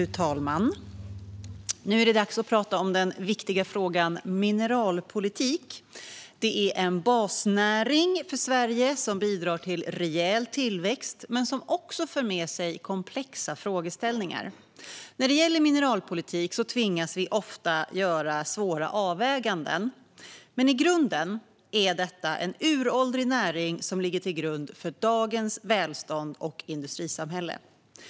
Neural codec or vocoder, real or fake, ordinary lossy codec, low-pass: none; real; none; none